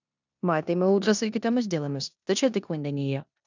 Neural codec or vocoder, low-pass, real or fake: codec, 16 kHz in and 24 kHz out, 0.9 kbps, LongCat-Audio-Codec, four codebook decoder; 7.2 kHz; fake